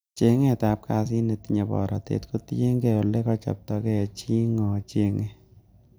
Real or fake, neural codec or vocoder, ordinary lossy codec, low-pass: real; none; none; none